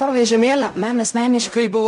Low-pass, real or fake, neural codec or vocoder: 10.8 kHz; fake; codec, 16 kHz in and 24 kHz out, 0.4 kbps, LongCat-Audio-Codec, fine tuned four codebook decoder